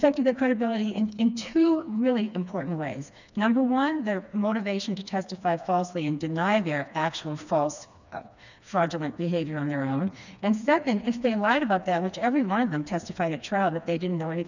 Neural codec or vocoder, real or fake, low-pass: codec, 16 kHz, 2 kbps, FreqCodec, smaller model; fake; 7.2 kHz